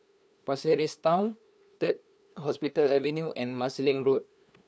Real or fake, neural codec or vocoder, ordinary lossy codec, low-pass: fake; codec, 16 kHz, 2 kbps, FunCodec, trained on LibriTTS, 25 frames a second; none; none